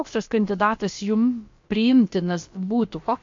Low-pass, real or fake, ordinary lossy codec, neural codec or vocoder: 7.2 kHz; fake; MP3, 48 kbps; codec, 16 kHz, about 1 kbps, DyCAST, with the encoder's durations